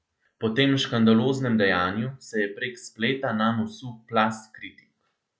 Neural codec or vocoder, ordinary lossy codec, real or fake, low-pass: none; none; real; none